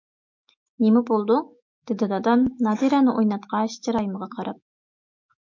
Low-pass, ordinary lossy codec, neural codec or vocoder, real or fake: 7.2 kHz; MP3, 48 kbps; autoencoder, 48 kHz, 128 numbers a frame, DAC-VAE, trained on Japanese speech; fake